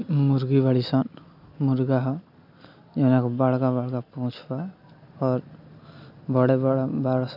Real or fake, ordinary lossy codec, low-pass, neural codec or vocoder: real; none; 5.4 kHz; none